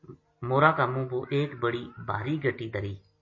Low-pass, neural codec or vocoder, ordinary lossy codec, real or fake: 7.2 kHz; none; MP3, 32 kbps; real